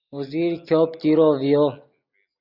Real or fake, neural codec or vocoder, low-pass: real; none; 5.4 kHz